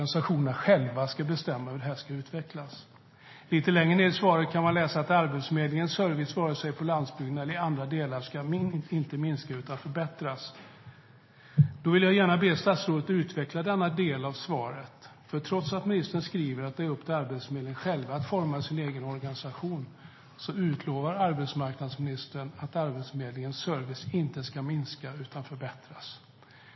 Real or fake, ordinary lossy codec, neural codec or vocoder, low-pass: real; MP3, 24 kbps; none; 7.2 kHz